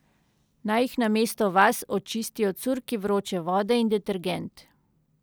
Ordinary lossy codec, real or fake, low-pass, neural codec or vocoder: none; real; none; none